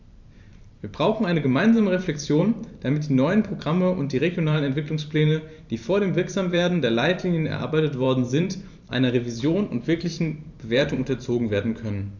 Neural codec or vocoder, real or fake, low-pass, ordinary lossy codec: vocoder, 44.1 kHz, 128 mel bands every 512 samples, BigVGAN v2; fake; 7.2 kHz; none